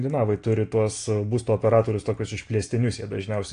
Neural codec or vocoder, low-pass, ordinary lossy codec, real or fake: none; 9.9 kHz; AAC, 48 kbps; real